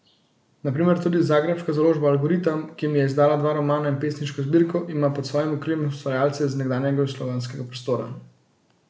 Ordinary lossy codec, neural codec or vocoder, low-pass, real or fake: none; none; none; real